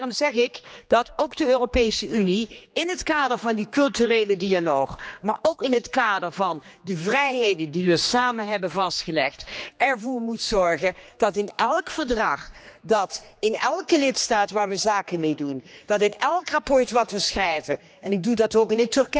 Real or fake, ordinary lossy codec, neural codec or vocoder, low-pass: fake; none; codec, 16 kHz, 2 kbps, X-Codec, HuBERT features, trained on general audio; none